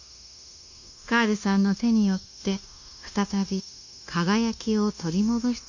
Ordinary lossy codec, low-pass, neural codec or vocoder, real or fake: none; 7.2 kHz; codec, 16 kHz, 0.9 kbps, LongCat-Audio-Codec; fake